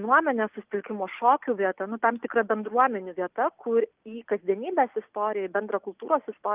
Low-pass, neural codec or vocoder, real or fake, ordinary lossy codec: 3.6 kHz; none; real; Opus, 24 kbps